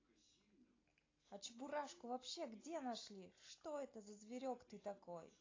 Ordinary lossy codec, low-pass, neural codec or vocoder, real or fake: AAC, 32 kbps; 7.2 kHz; none; real